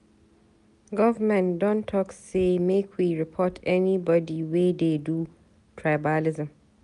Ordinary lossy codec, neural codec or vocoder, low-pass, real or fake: none; none; 10.8 kHz; real